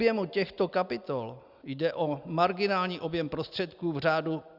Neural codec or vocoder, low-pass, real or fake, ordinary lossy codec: none; 5.4 kHz; real; Opus, 64 kbps